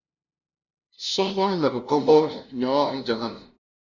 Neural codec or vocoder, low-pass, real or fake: codec, 16 kHz, 0.5 kbps, FunCodec, trained on LibriTTS, 25 frames a second; 7.2 kHz; fake